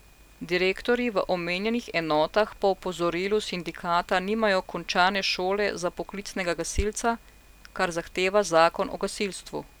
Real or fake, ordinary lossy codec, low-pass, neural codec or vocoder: real; none; none; none